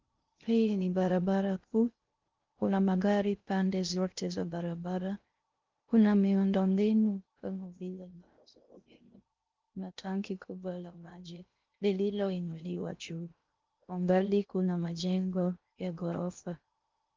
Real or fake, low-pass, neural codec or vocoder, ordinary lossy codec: fake; 7.2 kHz; codec, 16 kHz in and 24 kHz out, 0.6 kbps, FocalCodec, streaming, 2048 codes; Opus, 24 kbps